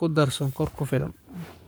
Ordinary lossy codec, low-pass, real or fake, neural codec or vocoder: none; none; fake; codec, 44.1 kHz, 7.8 kbps, Pupu-Codec